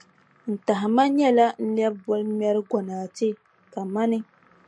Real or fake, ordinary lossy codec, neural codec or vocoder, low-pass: real; MP3, 48 kbps; none; 10.8 kHz